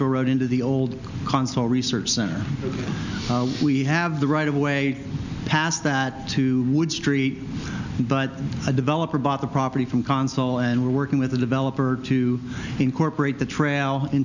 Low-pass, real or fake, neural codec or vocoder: 7.2 kHz; real; none